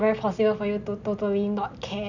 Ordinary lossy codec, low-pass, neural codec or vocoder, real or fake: none; 7.2 kHz; none; real